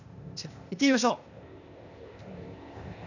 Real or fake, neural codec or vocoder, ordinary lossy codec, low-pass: fake; codec, 16 kHz, 0.8 kbps, ZipCodec; none; 7.2 kHz